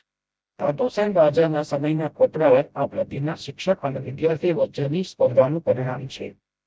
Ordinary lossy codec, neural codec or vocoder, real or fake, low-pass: none; codec, 16 kHz, 0.5 kbps, FreqCodec, smaller model; fake; none